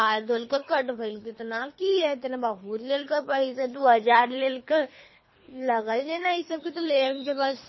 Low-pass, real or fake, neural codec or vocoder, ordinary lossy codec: 7.2 kHz; fake; codec, 24 kHz, 3 kbps, HILCodec; MP3, 24 kbps